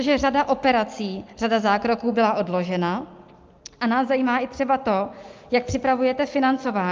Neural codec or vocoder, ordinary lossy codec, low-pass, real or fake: none; Opus, 24 kbps; 7.2 kHz; real